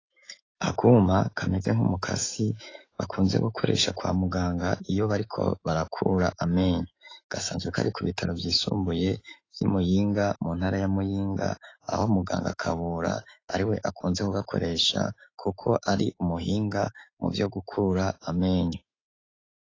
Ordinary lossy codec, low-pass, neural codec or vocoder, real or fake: AAC, 32 kbps; 7.2 kHz; autoencoder, 48 kHz, 128 numbers a frame, DAC-VAE, trained on Japanese speech; fake